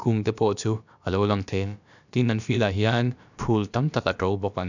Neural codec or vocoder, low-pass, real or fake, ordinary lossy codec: codec, 16 kHz, about 1 kbps, DyCAST, with the encoder's durations; 7.2 kHz; fake; none